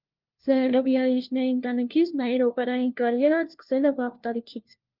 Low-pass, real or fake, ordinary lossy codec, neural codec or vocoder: 5.4 kHz; fake; Opus, 32 kbps; codec, 16 kHz, 1 kbps, FunCodec, trained on LibriTTS, 50 frames a second